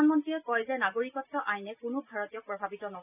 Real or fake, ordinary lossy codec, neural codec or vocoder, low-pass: real; none; none; 3.6 kHz